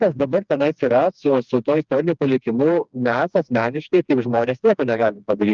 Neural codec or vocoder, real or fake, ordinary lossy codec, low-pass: codec, 16 kHz, 2 kbps, FreqCodec, smaller model; fake; Opus, 24 kbps; 7.2 kHz